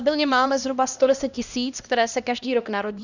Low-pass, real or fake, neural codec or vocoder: 7.2 kHz; fake; codec, 16 kHz, 1 kbps, X-Codec, HuBERT features, trained on LibriSpeech